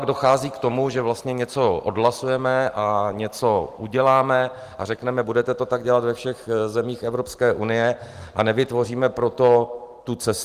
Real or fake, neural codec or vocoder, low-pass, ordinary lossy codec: real; none; 14.4 kHz; Opus, 24 kbps